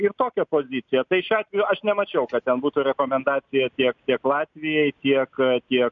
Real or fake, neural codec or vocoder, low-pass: real; none; 7.2 kHz